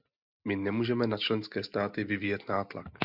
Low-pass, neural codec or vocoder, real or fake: 5.4 kHz; none; real